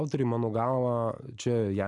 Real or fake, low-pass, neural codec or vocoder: real; 10.8 kHz; none